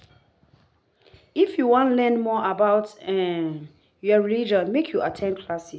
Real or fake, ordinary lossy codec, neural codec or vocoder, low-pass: real; none; none; none